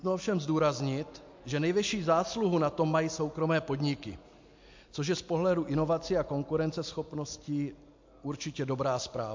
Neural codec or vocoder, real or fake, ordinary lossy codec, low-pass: none; real; MP3, 48 kbps; 7.2 kHz